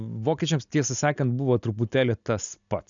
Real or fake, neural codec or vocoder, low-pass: real; none; 7.2 kHz